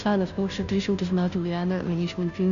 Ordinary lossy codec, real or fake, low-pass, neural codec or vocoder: AAC, 96 kbps; fake; 7.2 kHz; codec, 16 kHz, 0.5 kbps, FunCodec, trained on Chinese and English, 25 frames a second